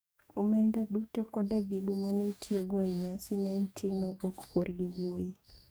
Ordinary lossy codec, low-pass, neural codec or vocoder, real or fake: none; none; codec, 44.1 kHz, 2.6 kbps, DAC; fake